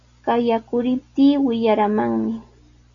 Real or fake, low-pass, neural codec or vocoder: real; 7.2 kHz; none